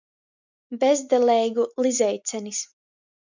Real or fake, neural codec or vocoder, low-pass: real; none; 7.2 kHz